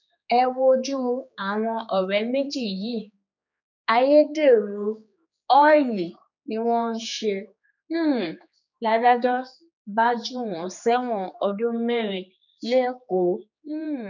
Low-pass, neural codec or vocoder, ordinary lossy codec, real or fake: 7.2 kHz; codec, 16 kHz, 4 kbps, X-Codec, HuBERT features, trained on general audio; none; fake